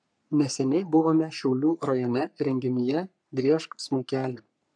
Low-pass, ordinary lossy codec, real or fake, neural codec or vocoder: 9.9 kHz; MP3, 96 kbps; fake; codec, 44.1 kHz, 3.4 kbps, Pupu-Codec